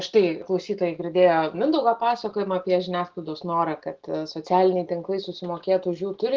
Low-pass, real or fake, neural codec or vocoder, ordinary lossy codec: 7.2 kHz; real; none; Opus, 16 kbps